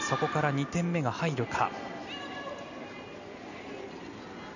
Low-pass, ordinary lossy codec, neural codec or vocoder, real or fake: 7.2 kHz; none; none; real